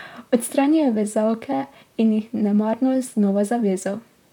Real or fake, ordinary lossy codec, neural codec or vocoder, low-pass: fake; none; vocoder, 44.1 kHz, 128 mel bands, Pupu-Vocoder; 19.8 kHz